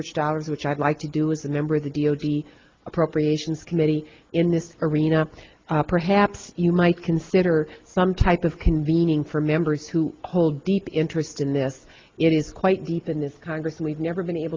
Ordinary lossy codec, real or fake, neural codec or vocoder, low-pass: Opus, 32 kbps; real; none; 7.2 kHz